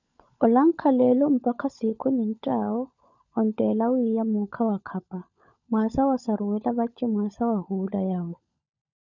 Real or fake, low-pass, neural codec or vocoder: fake; 7.2 kHz; codec, 16 kHz, 16 kbps, FunCodec, trained on LibriTTS, 50 frames a second